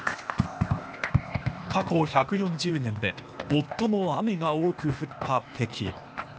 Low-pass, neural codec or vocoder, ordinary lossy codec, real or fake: none; codec, 16 kHz, 0.8 kbps, ZipCodec; none; fake